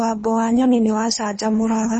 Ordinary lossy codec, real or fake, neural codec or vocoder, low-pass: MP3, 32 kbps; fake; codec, 24 kHz, 3 kbps, HILCodec; 10.8 kHz